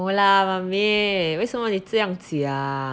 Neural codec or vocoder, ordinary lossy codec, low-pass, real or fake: none; none; none; real